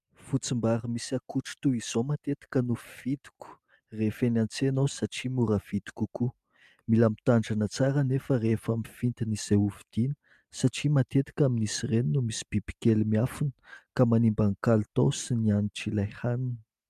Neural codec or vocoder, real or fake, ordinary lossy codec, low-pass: none; real; MP3, 96 kbps; 14.4 kHz